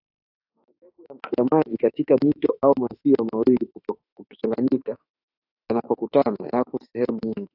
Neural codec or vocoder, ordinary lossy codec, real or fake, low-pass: autoencoder, 48 kHz, 32 numbers a frame, DAC-VAE, trained on Japanese speech; AAC, 32 kbps; fake; 5.4 kHz